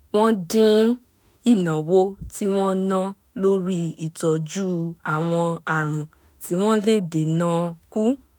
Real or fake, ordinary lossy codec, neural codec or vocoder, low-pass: fake; none; autoencoder, 48 kHz, 32 numbers a frame, DAC-VAE, trained on Japanese speech; none